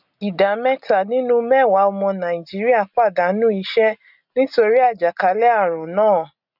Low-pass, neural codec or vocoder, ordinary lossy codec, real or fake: 5.4 kHz; none; none; real